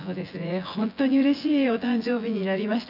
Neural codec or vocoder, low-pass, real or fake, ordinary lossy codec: vocoder, 24 kHz, 100 mel bands, Vocos; 5.4 kHz; fake; none